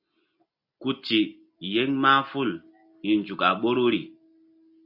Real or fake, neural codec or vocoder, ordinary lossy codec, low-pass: real; none; AAC, 48 kbps; 5.4 kHz